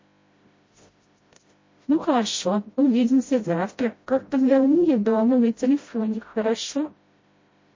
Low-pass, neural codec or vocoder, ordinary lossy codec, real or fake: 7.2 kHz; codec, 16 kHz, 0.5 kbps, FreqCodec, smaller model; MP3, 32 kbps; fake